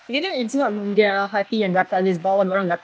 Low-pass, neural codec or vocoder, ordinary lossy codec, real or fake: none; codec, 16 kHz, 0.8 kbps, ZipCodec; none; fake